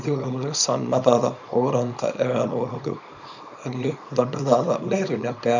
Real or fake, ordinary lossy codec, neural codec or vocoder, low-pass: fake; none; codec, 24 kHz, 0.9 kbps, WavTokenizer, small release; 7.2 kHz